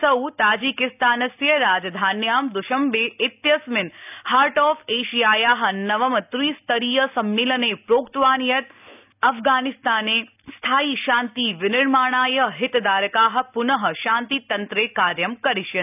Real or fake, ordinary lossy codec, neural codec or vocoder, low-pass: real; none; none; 3.6 kHz